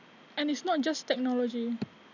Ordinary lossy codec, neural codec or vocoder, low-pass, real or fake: none; none; 7.2 kHz; real